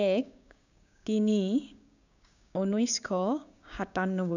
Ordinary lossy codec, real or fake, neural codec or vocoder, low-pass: none; fake; codec, 16 kHz, 6 kbps, DAC; 7.2 kHz